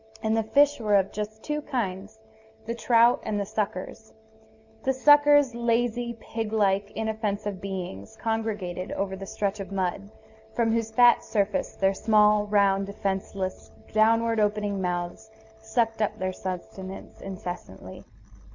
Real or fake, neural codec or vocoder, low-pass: real; none; 7.2 kHz